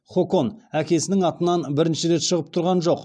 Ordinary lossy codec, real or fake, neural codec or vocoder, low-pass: none; real; none; 9.9 kHz